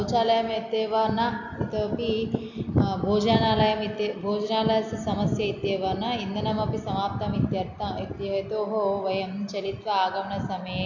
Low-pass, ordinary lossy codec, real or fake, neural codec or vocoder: 7.2 kHz; none; real; none